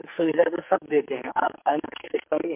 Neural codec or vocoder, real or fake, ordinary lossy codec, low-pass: codec, 44.1 kHz, 2.6 kbps, SNAC; fake; MP3, 32 kbps; 3.6 kHz